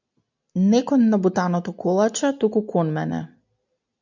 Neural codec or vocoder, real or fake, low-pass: none; real; 7.2 kHz